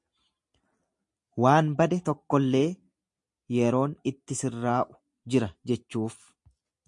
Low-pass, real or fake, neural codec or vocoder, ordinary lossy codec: 10.8 kHz; real; none; MP3, 48 kbps